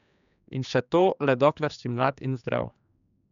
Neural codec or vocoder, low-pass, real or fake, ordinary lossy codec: codec, 16 kHz, 2 kbps, X-Codec, HuBERT features, trained on general audio; 7.2 kHz; fake; none